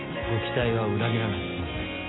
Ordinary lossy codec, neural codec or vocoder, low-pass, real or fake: AAC, 16 kbps; none; 7.2 kHz; real